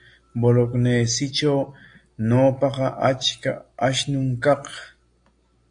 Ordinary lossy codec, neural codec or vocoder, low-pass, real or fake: AAC, 64 kbps; none; 9.9 kHz; real